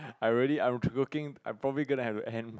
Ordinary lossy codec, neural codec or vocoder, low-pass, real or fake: none; none; none; real